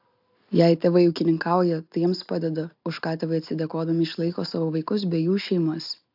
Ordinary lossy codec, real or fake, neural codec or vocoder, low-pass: AAC, 48 kbps; real; none; 5.4 kHz